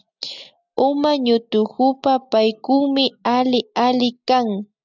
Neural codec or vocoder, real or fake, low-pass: none; real; 7.2 kHz